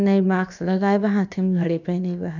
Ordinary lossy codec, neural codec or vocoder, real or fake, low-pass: none; codec, 16 kHz, about 1 kbps, DyCAST, with the encoder's durations; fake; 7.2 kHz